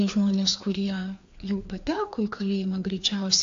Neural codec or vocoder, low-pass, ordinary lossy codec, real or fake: codec, 16 kHz, 2 kbps, FreqCodec, larger model; 7.2 kHz; AAC, 48 kbps; fake